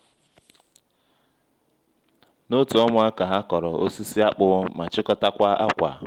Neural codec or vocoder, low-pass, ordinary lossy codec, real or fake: none; 19.8 kHz; Opus, 24 kbps; real